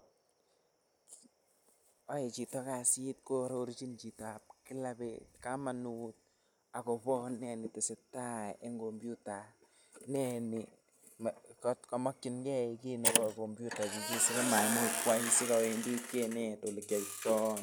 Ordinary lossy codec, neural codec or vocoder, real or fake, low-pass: none; none; real; none